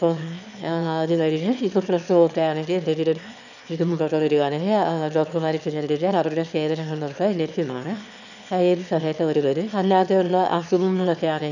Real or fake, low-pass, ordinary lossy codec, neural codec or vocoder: fake; 7.2 kHz; none; autoencoder, 22.05 kHz, a latent of 192 numbers a frame, VITS, trained on one speaker